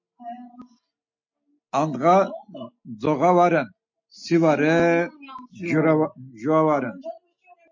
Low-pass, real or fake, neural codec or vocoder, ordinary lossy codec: 7.2 kHz; real; none; MP3, 48 kbps